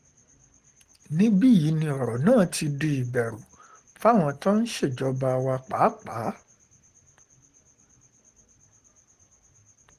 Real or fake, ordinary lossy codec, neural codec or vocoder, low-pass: real; Opus, 16 kbps; none; 14.4 kHz